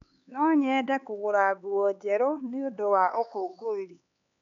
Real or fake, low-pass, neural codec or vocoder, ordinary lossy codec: fake; 7.2 kHz; codec, 16 kHz, 4 kbps, X-Codec, HuBERT features, trained on LibriSpeech; none